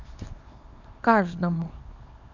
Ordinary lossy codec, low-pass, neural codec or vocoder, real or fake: none; 7.2 kHz; codec, 24 kHz, 0.9 kbps, WavTokenizer, small release; fake